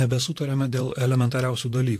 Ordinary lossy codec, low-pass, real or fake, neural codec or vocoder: AAC, 64 kbps; 14.4 kHz; fake; vocoder, 44.1 kHz, 128 mel bands, Pupu-Vocoder